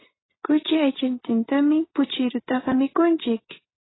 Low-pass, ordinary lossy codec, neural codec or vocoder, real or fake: 7.2 kHz; AAC, 16 kbps; vocoder, 24 kHz, 100 mel bands, Vocos; fake